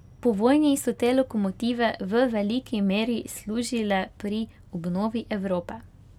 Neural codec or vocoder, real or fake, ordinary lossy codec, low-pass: none; real; none; 19.8 kHz